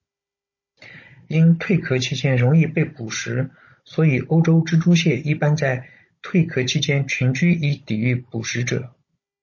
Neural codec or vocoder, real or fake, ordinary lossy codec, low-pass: codec, 16 kHz, 16 kbps, FunCodec, trained on Chinese and English, 50 frames a second; fake; MP3, 32 kbps; 7.2 kHz